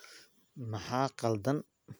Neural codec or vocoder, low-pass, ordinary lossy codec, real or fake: none; none; none; real